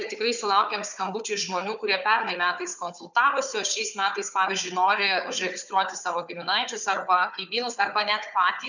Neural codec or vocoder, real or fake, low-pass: codec, 16 kHz, 4 kbps, FunCodec, trained on Chinese and English, 50 frames a second; fake; 7.2 kHz